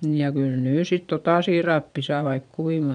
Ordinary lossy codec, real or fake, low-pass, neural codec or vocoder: none; fake; 9.9 kHz; vocoder, 22.05 kHz, 80 mel bands, Vocos